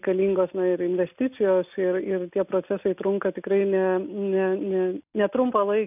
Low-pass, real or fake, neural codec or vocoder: 3.6 kHz; real; none